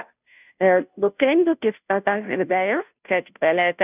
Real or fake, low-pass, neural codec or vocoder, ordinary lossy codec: fake; 3.6 kHz; codec, 16 kHz, 0.5 kbps, FunCodec, trained on Chinese and English, 25 frames a second; none